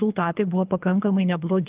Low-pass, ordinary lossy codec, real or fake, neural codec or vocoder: 3.6 kHz; Opus, 32 kbps; fake; codec, 24 kHz, 3 kbps, HILCodec